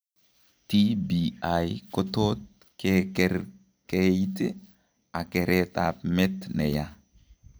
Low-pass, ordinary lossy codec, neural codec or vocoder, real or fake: none; none; none; real